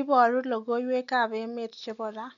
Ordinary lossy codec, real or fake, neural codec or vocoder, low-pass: none; real; none; 7.2 kHz